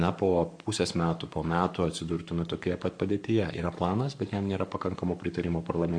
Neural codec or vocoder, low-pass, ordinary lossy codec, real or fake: codec, 44.1 kHz, 7.8 kbps, Pupu-Codec; 9.9 kHz; AAC, 64 kbps; fake